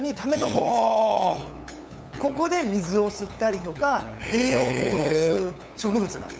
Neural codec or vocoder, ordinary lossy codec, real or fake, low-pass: codec, 16 kHz, 8 kbps, FunCodec, trained on LibriTTS, 25 frames a second; none; fake; none